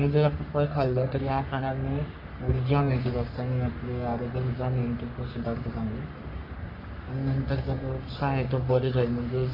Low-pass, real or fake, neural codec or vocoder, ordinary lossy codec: 5.4 kHz; fake; codec, 44.1 kHz, 3.4 kbps, Pupu-Codec; Opus, 64 kbps